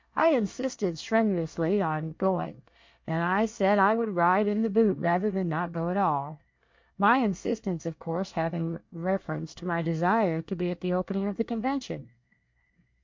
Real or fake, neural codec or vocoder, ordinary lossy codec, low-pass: fake; codec, 24 kHz, 1 kbps, SNAC; MP3, 48 kbps; 7.2 kHz